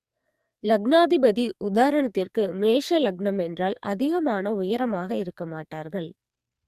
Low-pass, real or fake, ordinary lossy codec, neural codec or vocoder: 14.4 kHz; fake; Opus, 64 kbps; codec, 44.1 kHz, 2.6 kbps, SNAC